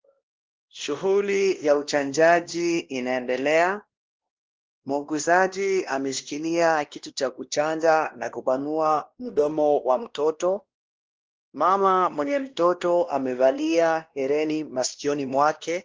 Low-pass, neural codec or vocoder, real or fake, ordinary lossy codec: 7.2 kHz; codec, 16 kHz, 1 kbps, X-Codec, WavLM features, trained on Multilingual LibriSpeech; fake; Opus, 16 kbps